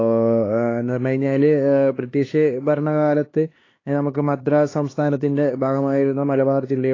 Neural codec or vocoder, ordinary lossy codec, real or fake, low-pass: codec, 16 kHz, 2 kbps, X-Codec, HuBERT features, trained on LibriSpeech; AAC, 32 kbps; fake; 7.2 kHz